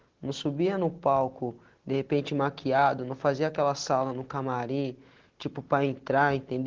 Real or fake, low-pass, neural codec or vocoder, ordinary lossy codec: real; 7.2 kHz; none; Opus, 16 kbps